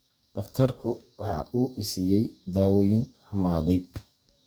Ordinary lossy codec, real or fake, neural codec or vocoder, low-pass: none; fake; codec, 44.1 kHz, 2.6 kbps, DAC; none